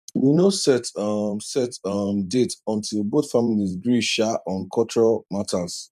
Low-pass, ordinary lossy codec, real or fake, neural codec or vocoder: 14.4 kHz; none; fake; vocoder, 44.1 kHz, 128 mel bands every 512 samples, BigVGAN v2